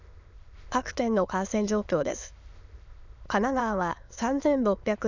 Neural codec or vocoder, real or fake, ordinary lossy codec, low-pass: autoencoder, 22.05 kHz, a latent of 192 numbers a frame, VITS, trained on many speakers; fake; none; 7.2 kHz